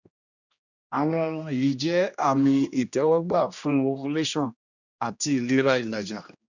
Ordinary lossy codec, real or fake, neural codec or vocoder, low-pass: none; fake; codec, 16 kHz, 1 kbps, X-Codec, HuBERT features, trained on general audio; 7.2 kHz